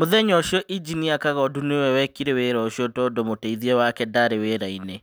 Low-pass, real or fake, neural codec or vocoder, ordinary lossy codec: none; real; none; none